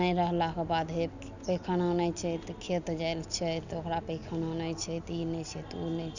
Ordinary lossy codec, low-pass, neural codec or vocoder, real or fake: none; 7.2 kHz; none; real